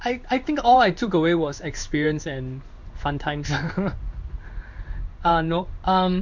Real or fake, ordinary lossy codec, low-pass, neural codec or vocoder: fake; none; 7.2 kHz; codec, 16 kHz in and 24 kHz out, 1 kbps, XY-Tokenizer